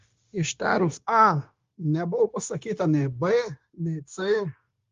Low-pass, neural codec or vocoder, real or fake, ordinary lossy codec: 7.2 kHz; codec, 16 kHz, 0.9 kbps, LongCat-Audio-Codec; fake; Opus, 32 kbps